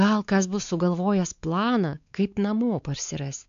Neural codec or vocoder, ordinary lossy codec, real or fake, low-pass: codec, 16 kHz, 4 kbps, X-Codec, WavLM features, trained on Multilingual LibriSpeech; AAC, 64 kbps; fake; 7.2 kHz